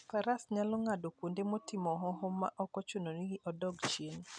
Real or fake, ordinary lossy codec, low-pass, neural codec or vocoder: real; none; 9.9 kHz; none